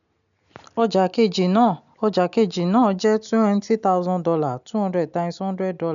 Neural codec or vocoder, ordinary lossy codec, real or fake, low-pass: none; none; real; 7.2 kHz